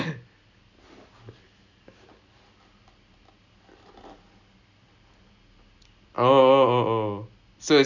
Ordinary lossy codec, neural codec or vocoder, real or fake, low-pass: none; none; real; 7.2 kHz